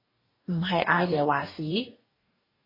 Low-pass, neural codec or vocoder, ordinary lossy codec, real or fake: 5.4 kHz; codec, 44.1 kHz, 2.6 kbps, DAC; MP3, 24 kbps; fake